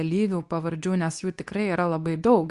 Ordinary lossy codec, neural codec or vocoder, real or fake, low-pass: Opus, 64 kbps; codec, 24 kHz, 0.9 kbps, WavTokenizer, medium speech release version 2; fake; 10.8 kHz